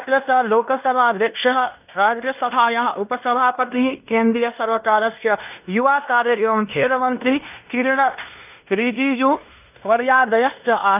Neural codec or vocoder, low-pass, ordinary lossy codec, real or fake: codec, 16 kHz in and 24 kHz out, 0.9 kbps, LongCat-Audio-Codec, fine tuned four codebook decoder; 3.6 kHz; none; fake